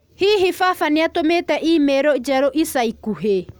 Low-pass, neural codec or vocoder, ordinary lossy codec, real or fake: none; none; none; real